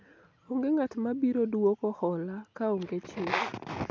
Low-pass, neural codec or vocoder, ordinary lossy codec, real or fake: none; none; none; real